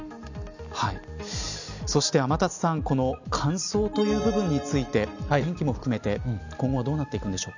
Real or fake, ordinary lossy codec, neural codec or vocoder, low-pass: real; none; none; 7.2 kHz